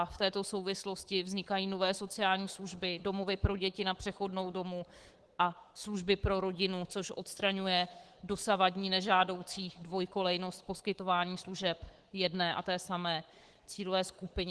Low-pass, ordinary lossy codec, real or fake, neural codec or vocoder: 10.8 kHz; Opus, 16 kbps; fake; codec, 24 kHz, 3.1 kbps, DualCodec